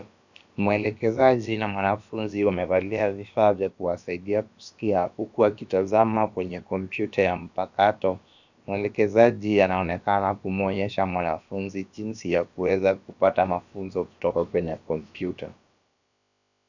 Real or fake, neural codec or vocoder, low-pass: fake; codec, 16 kHz, about 1 kbps, DyCAST, with the encoder's durations; 7.2 kHz